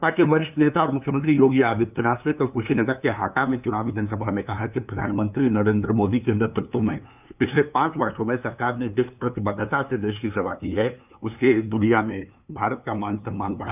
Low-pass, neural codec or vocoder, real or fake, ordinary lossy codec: 3.6 kHz; codec, 16 kHz, 2 kbps, FunCodec, trained on LibriTTS, 25 frames a second; fake; none